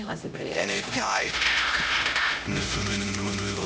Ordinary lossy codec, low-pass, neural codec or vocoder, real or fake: none; none; codec, 16 kHz, 0.5 kbps, X-Codec, HuBERT features, trained on LibriSpeech; fake